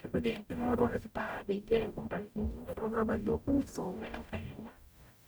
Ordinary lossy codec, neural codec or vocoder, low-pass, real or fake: none; codec, 44.1 kHz, 0.9 kbps, DAC; none; fake